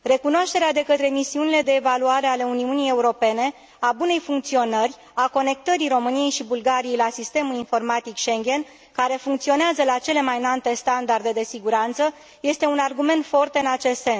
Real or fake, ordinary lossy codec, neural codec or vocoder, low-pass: real; none; none; none